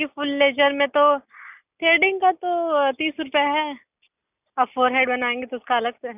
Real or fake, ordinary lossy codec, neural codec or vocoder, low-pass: real; none; none; 3.6 kHz